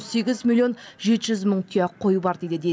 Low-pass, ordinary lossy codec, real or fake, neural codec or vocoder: none; none; real; none